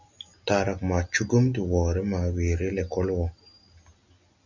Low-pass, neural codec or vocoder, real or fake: 7.2 kHz; none; real